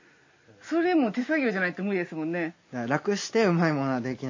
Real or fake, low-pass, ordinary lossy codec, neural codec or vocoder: real; 7.2 kHz; MP3, 32 kbps; none